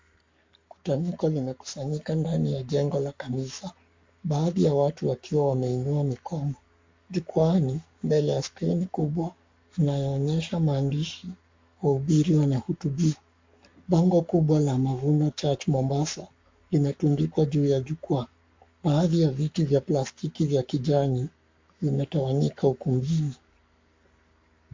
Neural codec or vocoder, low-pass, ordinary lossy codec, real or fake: codec, 44.1 kHz, 7.8 kbps, Pupu-Codec; 7.2 kHz; MP3, 48 kbps; fake